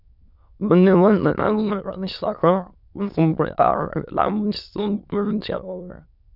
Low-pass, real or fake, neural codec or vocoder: 5.4 kHz; fake; autoencoder, 22.05 kHz, a latent of 192 numbers a frame, VITS, trained on many speakers